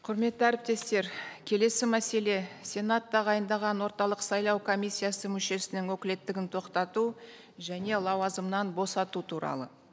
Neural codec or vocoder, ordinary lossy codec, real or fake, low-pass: none; none; real; none